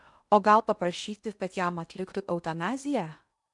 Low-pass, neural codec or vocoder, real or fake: 10.8 kHz; codec, 16 kHz in and 24 kHz out, 0.8 kbps, FocalCodec, streaming, 65536 codes; fake